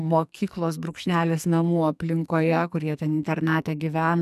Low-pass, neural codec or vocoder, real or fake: 14.4 kHz; codec, 44.1 kHz, 2.6 kbps, SNAC; fake